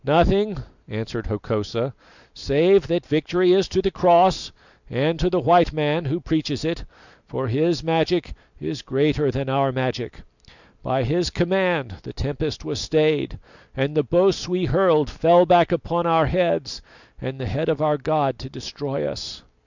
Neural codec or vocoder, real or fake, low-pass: none; real; 7.2 kHz